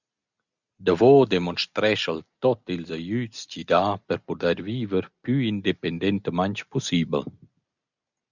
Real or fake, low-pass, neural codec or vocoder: real; 7.2 kHz; none